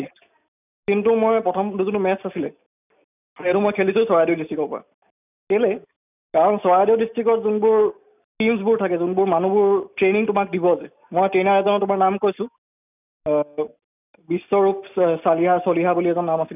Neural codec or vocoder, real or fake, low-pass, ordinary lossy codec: none; real; 3.6 kHz; none